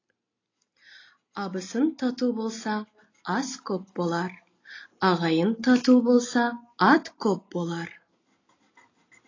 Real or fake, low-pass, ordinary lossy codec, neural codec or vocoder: real; 7.2 kHz; AAC, 32 kbps; none